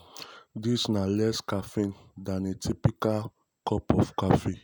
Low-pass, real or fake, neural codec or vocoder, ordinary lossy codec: none; real; none; none